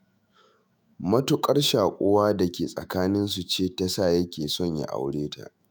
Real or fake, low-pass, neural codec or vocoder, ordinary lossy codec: fake; none; autoencoder, 48 kHz, 128 numbers a frame, DAC-VAE, trained on Japanese speech; none